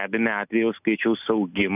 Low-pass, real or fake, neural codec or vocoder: 3.6 kHz; real; none